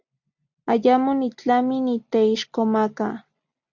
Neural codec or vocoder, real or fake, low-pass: none; real; 7.2 kHz